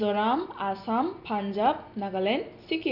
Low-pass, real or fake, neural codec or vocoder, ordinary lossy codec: 5.4 kHz; real; none; none